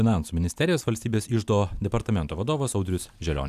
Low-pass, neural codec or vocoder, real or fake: 14.4 kHz; none; real